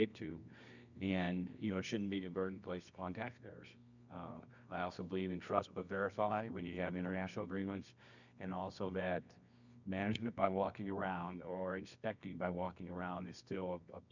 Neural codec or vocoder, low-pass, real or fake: codec, 24 kHz, 0.9 kbps, WavTokenizer, medium music audio release; 7.2 kHz; fake